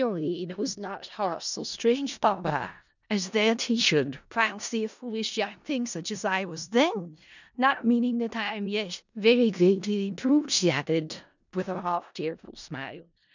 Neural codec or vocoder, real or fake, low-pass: codec, 16 kHz in and 24 kHz out, 0.4 kbps, LongCat-Audio-Codec, four codebook decoder; fake; 7.2 kHz